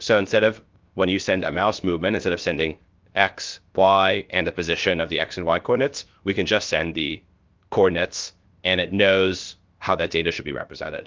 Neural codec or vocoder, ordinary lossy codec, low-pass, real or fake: codec, 16 kHz, about 1 kbps, DyCAST, with the encoder's durations; Opus, 32 kbps; 7.2 kHz; fake